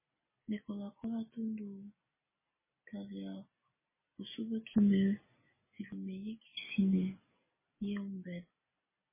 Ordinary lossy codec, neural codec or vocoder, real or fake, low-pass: MP3, 16 kbps; none; real; 3.6 kHz